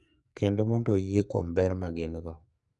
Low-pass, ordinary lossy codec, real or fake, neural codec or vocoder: 10.8 kHz; MP3, 96 kbps; fake; codec, 44.1 kHz, 2.6 kbps, SNAC